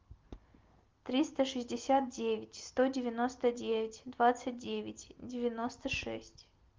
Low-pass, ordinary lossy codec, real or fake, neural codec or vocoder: 7.2 kHz; Opus, 32 kbps; real; none